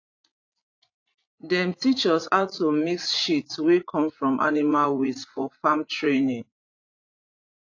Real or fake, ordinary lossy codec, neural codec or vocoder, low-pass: fake; AAC, 48 kbps; vocoder, 44.1 kHz, 80 mel bands, Vocos; 7.2 kHz